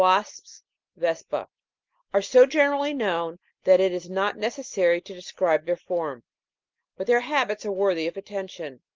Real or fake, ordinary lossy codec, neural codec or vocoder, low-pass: real; Opus, 24 kbps; none; 7.2 kHz